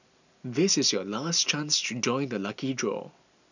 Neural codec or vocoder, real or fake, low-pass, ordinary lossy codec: none; real; 7.2 kHz; none